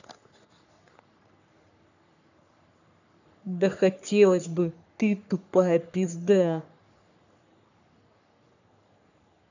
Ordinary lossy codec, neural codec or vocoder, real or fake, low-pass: none; codec, 44.1 kHz, 3.4 kbps, Pupu-Codec; fake; 7.2 kHz